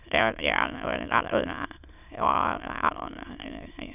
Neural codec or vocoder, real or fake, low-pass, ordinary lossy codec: autoencoder, 22.05 kHz, a latent of 192 numbers a frame, VITS, trained on many speakers; fake; 3.6 kHz; none